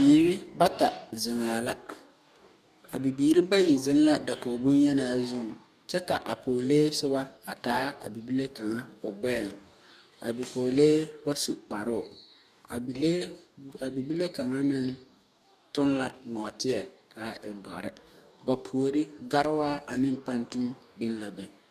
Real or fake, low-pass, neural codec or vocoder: fake; 14.4 kHz; codec, 44.1 kHz, 2.6 kbps, DAC